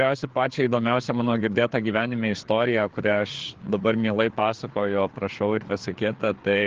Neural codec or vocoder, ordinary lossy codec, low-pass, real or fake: codec, 16 kHz, 4 kbps, FreqCodec, larger model; Opus, 16 kbps; 7.2 kHz; fake